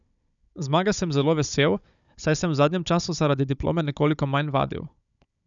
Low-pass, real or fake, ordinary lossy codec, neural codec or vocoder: 7.2 kHz; fake; none; codec, 16 kHz, 16 kbps, FunCodec, trained on Chinese and English, 50 frames a second